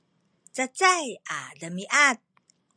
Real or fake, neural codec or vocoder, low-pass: real; none; 9.9 kHz